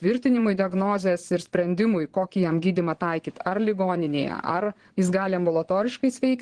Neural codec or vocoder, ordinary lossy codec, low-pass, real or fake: vocoder, 22.05 kHz, 80 mel bands, Vocos; Opus, 16 kbps; 9.9 kHz; fake